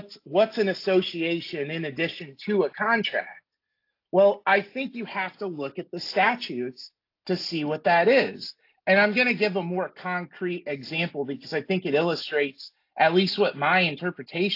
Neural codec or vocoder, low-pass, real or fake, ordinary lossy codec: none; 5.4 kHz; real; AAC, 32 kbps